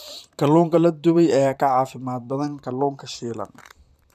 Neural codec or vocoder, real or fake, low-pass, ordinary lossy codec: vocoder, 44.1 kHz, 128 mel bands, Pupu-Vocoder; fake; 14.4 kHz; none